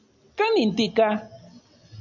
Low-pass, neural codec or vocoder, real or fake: 7.2 kHz; none; real